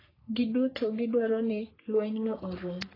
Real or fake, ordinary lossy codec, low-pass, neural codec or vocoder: fake; AAC, 24 kbps; 5.4 kHz; codec, 44.1 kHz, 3.4 kbps, Pupu-Codec